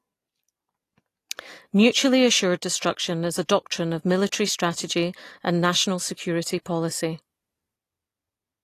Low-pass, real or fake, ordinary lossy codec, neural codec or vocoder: 14.4 kHz; real; AAC, 48 kbps; none